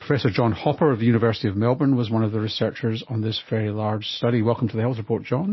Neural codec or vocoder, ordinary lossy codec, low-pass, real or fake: none; MP3, 24 kbps; 7.2 kHz; real